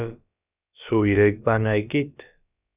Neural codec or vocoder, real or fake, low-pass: codec, 16 kHz, about 1 kbps, DyCAST, with the encoder's durations; fake; 3.6 kHz